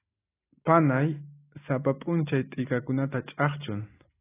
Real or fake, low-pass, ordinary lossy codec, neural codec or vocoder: real; 3.6 kHz; AAC, 16 kbps; none